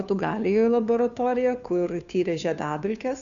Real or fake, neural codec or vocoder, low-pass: fake; codec, 16 kHz, 2 kbps, FunCodec, trained on Chinese and English, 25 frames a second; 7.2 kHz